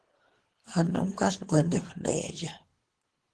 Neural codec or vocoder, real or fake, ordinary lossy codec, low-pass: codec, 24 kHz, 3 kbps, HILCodec; fake; Opus, 16 kbps; 10.8 kHz